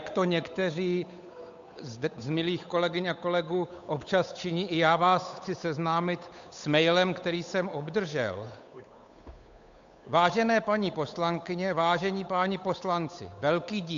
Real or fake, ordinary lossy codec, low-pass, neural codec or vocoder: fake; MP3, 64 kbps; 7.2 kHz; codec, 16 kHz, 8 kbps, FunCodec, trained on Chinese and English, 25 frames a second